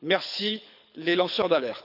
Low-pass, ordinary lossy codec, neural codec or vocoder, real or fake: 5.4 kHz; none; codec, 24 kHz, 6 kbps, HILCodec; fake